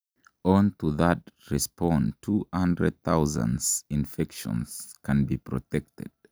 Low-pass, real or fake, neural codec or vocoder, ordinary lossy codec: none; real; none; none